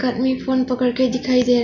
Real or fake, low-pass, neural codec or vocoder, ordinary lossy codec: real; 7.2 kHz; none; none